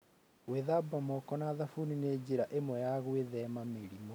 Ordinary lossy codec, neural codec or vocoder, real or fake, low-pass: none; none; real; none